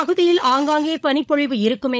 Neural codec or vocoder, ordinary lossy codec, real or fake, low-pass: codec, 16 kHz, 16 kbps, FunCodec, trained on LibriTTS, 50 frames a second; none; fake; none